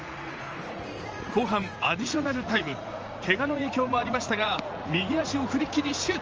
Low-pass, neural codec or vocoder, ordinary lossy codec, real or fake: 7.2 kHz; vocoder, 44.1 kHz, 80 mel bands, Vocos; Opus, 24 kbps; fake